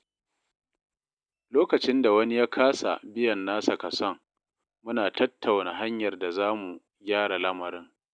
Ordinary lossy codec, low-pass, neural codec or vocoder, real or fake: none; 9.9 kHz; none; real